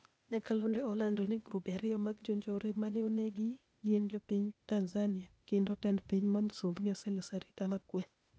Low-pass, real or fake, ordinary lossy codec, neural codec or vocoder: none; fake; none; codec, 16 kHz, 0.8 kbps, ZipCodec